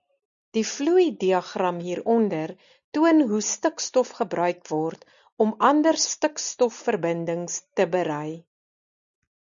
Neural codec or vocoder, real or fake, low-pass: none; real; 7.2 kHz